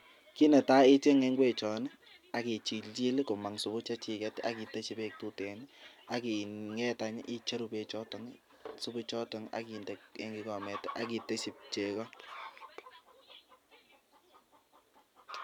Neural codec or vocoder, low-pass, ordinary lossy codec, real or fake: none; 19.8 kHz; none; real